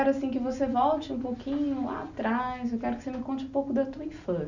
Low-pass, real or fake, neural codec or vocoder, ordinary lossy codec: 7.2 kHz; real; none; none